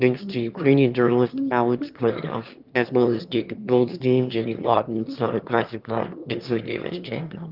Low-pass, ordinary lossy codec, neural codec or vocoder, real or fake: 5.4 kHz; Opus, 32 kbps; autoencoder, 22.05 kHz, a latent of 192 numbers a frame, VITS, trained on one speaker; fake